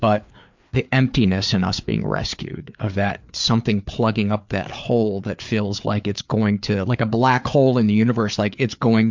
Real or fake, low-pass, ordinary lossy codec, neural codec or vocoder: fake; 7.2 kHz; MP3, 64 kbps; codec, 16 kHz, 4 kbps, FreqCodec, larger model